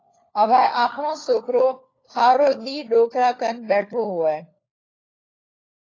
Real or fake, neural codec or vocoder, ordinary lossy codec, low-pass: fake; codec, 16 kHz, 4 kbps, FunCodec, trained on LibriTTS, 50 frames a second; AAC, 32 kbps; 7.2 kHz